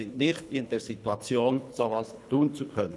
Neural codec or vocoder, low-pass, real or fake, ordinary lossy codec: codec, 24 kHz, 3 kbps, HILCodec; none; fake; none